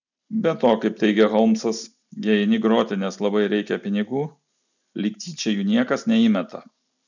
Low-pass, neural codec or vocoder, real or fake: 7.2 kHz; none; real